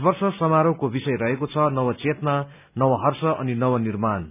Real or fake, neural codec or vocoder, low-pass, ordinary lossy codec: real; none; 3.6 kHz; none